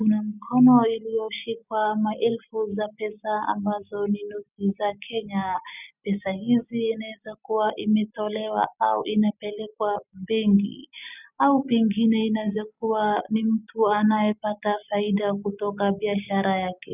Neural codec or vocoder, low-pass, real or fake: none; 3.6 kHz; real